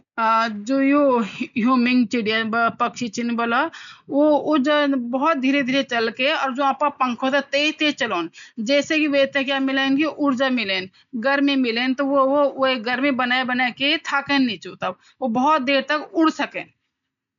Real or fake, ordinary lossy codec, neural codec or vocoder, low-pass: real; none; none; 7.2 kHz